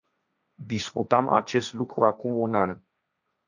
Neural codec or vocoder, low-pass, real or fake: codec, 16 kHz, 1.1 kbps, Voila-Tokenizer; 7.2 kHz; fake